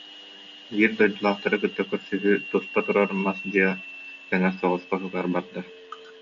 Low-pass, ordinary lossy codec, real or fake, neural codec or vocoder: 7.2 kHz; MP3, 96 kbps; real; none